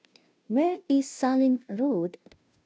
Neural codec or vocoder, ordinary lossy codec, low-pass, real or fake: codec, 16 kHz, 0.5 kbps, FunCodec, trained on Chinese and English, 25 frames a second; none; none; fake